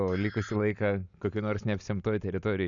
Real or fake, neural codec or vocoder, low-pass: real; none; 7.2 kHz